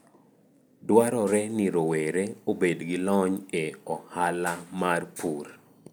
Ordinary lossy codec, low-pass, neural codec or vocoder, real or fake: none; none; none; real